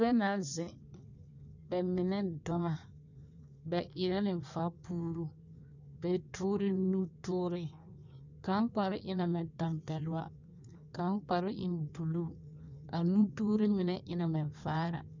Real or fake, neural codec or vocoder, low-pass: fake; codec, 16 kHz in and 24 kHz out, 1.1 kbps, FireRedTTS-2 codec; 7.2 kHz